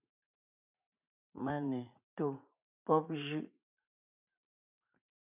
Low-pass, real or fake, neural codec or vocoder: 3.6 kHz; fake; vocoder, 44.1 kHz, 80 mel bands, Vocos